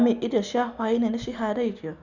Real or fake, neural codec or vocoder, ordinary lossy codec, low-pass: real; none; none; 7.2 kHz